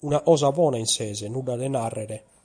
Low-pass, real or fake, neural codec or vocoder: 9.9 kHz; real; none